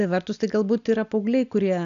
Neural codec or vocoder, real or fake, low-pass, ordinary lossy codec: none; real; 7.2 kHz; MP3, 96 kbps